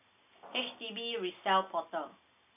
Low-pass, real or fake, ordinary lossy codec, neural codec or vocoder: 3.6 kHz; real; none; none